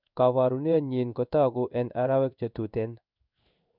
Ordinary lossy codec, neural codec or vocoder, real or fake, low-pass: none; codec, 16 kHz in and 24 kHz out, 1 kbps, XY-Tokenizer; fake; 5.4 kHz